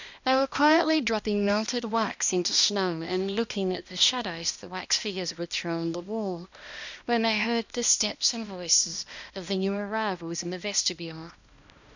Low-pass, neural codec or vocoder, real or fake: 7.2 kHz; codec, 16 kHz, 1 kbps, X-Codec, HuBERT features, trained on balanced general audio; fake